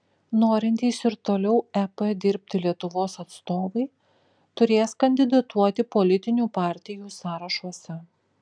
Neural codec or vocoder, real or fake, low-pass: none; real; 9.9 kHz